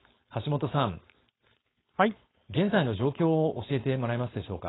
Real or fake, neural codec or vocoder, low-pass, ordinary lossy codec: fake; codec, 16 kHz, 4.8 kbps, FACodec; 7.2 kHz; AAC, 16 kbps